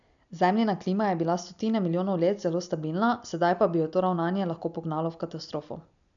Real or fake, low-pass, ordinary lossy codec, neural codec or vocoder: real; 7.2 kHz; none; none